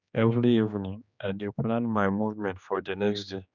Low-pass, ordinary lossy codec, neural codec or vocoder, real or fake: 7.2 kHz; none; codec, 16 kHz, 2 kbps, X-Codec, HuBERT features, trained on general audio; fake